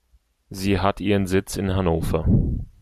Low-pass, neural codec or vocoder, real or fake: 14.4 kHz; none; real